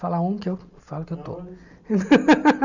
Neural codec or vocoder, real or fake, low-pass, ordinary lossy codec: none; real; 7.2 kHz; none